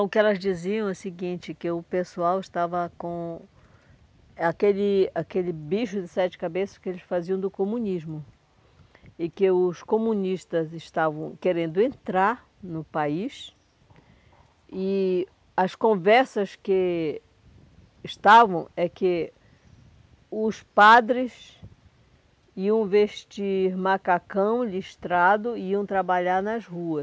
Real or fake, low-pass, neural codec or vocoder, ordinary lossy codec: real; none; none; none